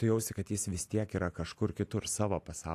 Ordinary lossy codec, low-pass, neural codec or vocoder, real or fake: AAC, 64 kbps; 14.4 kHz; none; real